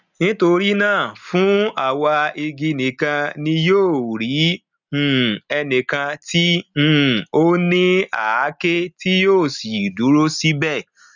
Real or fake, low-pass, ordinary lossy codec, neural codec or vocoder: real; 7.2 kHz; none; none